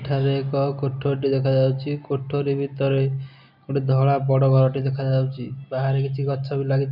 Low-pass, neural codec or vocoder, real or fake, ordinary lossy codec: 5.4 kHz; none; real; none